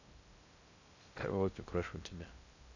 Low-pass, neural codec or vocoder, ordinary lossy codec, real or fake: 7.2 kHz; codec, 16 kHz in and 24 kHz out, 0.6 kbps, FocalCodec, streaming, 2048 codes; none; fake